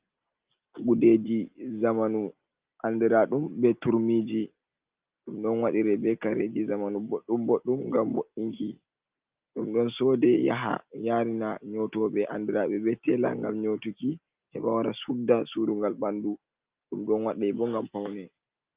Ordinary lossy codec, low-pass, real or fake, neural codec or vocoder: Opus, 32 kbps; 3.6 kHz; real; none